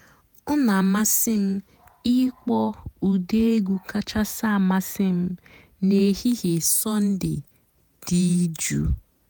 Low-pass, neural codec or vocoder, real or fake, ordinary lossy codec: none; vocoder, 48 kHz, 128 mel bands, Vocos; fake; none